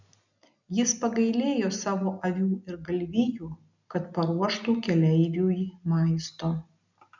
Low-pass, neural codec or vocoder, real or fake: 7.2 kHz; none; real